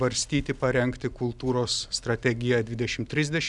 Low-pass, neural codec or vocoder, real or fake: 10.8 kHz; none; real